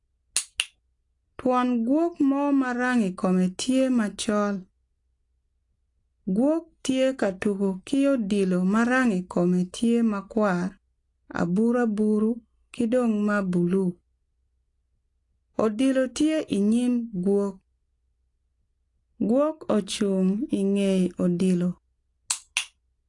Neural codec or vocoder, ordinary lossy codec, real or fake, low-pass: none; AAC, 48 kbps; real; 10.8 kHz